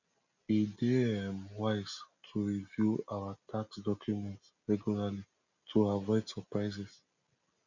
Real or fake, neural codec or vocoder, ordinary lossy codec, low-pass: real; none; none; 7.2 kHz